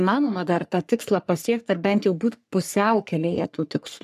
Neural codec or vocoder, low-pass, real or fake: codec, 44.1 kHz, 3.4 kbps, Pupu-Codec; 14.4 kHz; fake